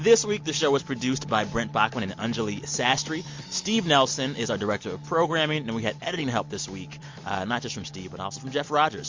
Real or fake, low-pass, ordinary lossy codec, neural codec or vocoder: real; 7.2 kHz; MP3, 48 kbps; none